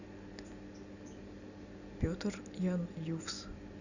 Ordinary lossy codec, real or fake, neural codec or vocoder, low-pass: none; real; none; 7.2 kHz